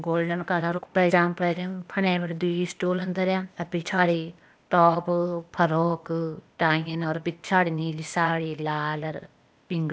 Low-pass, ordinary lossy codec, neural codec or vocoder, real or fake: none; none; codec, 16 kHz, 0.8 kbps, ZipCodec; fake